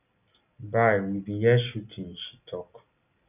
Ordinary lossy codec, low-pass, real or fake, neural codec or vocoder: none; 3.6 kHz; real; none